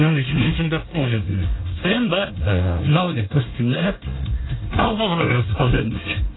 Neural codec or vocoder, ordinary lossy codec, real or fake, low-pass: codec, 24 kHz, 1 kbps, SNAC; AAC, 16 kbps; fake; 7.2 kHz